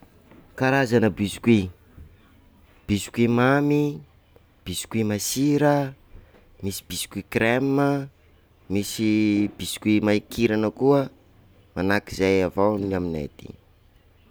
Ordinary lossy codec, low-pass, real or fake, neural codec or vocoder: none; none; real; none